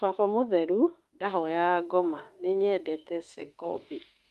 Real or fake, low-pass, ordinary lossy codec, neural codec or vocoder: fake; 14.4 kHz; Opus, 32 kbps; autoencoder, 48 kHz, 32 numbers a frame, DAC-VAE, trained on Japanese speech